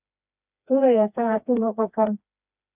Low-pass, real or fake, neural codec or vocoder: 3.6 kHz; fake; codec, 16 kHz, 2 kbps, FreqCodec, smaller model